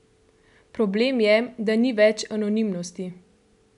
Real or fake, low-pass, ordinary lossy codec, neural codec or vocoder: real; 10.8 kHz; none; none